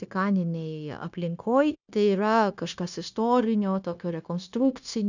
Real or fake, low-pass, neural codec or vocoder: fake; 7.2 kHz; codec, 16 kHz, 0.9 kbps, LongCat-Audio-Codec